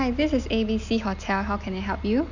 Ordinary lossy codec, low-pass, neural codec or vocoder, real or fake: none; 7.2 kHz; none; real